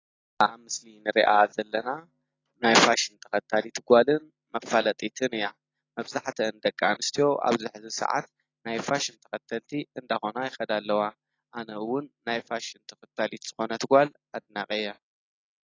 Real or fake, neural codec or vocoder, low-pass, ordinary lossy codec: real; none; 7.2 kHz; AAC, 32 kbps